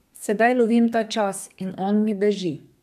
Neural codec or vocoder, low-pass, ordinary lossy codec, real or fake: codec, 32 kHz, 1.9 kbps, SNAC; 14.4 kHz; none; fake